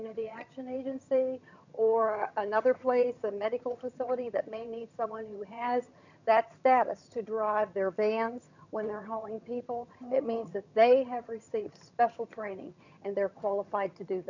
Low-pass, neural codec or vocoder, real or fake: 7.2 kHz; vocoder, 22.05 kHz, 80 mel bands, HiFi-GAN; fake